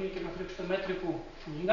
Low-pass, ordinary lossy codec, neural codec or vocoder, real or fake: 7.2 kHz; Opus, 64 kbps; none; real